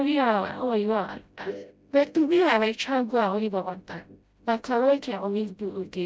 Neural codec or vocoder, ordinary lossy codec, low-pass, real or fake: codec, 16 kHz, 0.5 kbps, FreqCodec, smaller model; none; none; fake